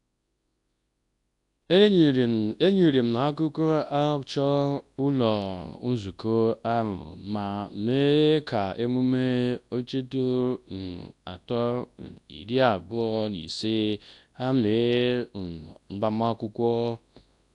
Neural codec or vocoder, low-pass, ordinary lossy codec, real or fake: codec, 24 kHz, 0.9 kbps, WavTokenizer, large speech release; 10.8 kHz; AAC, 64 kbps; fake